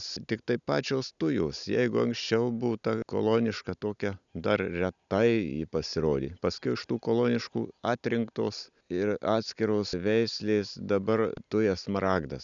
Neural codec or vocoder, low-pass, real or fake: none; 7.2 kHz; real